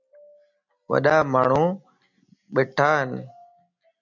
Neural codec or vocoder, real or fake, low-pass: none; real; 7.2 kHz